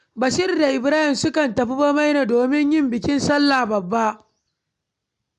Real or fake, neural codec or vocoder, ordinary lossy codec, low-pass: real; none; none; 10.8 kHz